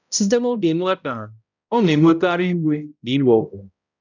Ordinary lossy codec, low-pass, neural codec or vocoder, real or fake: none; 7.2 kHz; codec, 16 kHz, 0.5 kbps, X-Codec, HuBERT features, trained on balanced general audio; fake